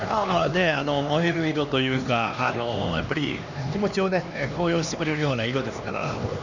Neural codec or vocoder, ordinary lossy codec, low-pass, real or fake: codec, 16 kHz, 2 kbps, X-Codec, HuBERT features, trained on LibriSpeech; none; 7.2 kHz; fake